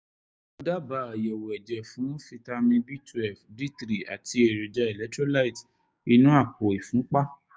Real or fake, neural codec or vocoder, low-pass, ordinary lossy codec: fake; codec, 16 kHz, 6 kbps, DAC; none; none